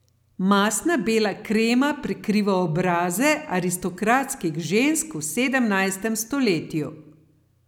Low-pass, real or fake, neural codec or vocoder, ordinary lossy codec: 19.8 kHz; real; none; none